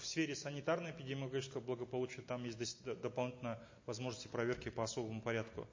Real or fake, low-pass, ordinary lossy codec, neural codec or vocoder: real; 7.2 kHz; MP3, 32 kbps; none